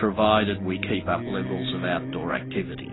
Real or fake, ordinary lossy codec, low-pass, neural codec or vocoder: real; AAC, 16 kbps; 7.2 kHz; none